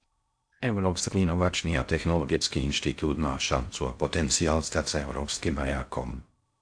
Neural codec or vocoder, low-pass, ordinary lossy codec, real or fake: codec, 16 kHz in and 24 kHz out, 0.6 kbps, FocalCodec, streaming, 2048 codes; 9.9 kHz; AAC, 64 kbps; fake